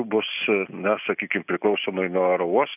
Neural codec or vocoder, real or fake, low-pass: none; real; 3.6 kHz